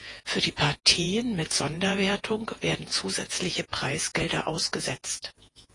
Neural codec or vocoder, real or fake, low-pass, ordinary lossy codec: vocoder, 48 kHz, 128 mel bands, Vocos; fake; 10.8 kHz; AAC, 32 kbps